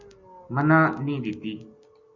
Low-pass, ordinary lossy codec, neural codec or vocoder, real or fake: 7.2 kHz; Opus, 64 kbps; none; real